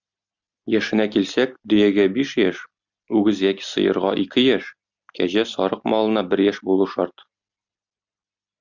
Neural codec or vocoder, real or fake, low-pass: none; real; 7.2 kHz